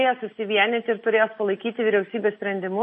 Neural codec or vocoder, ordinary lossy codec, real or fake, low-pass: none; MP3, 32 kbps; real; 10.8 kHz